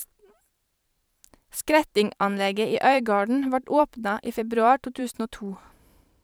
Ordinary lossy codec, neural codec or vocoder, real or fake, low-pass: none; vocoder, 44.1 kHz, 128 mel bands every 512 samples, BigVGAN v2; fake; none